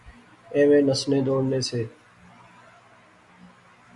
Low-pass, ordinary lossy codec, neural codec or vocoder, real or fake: 10.8 kHz; MP3, 96 kbps; none; real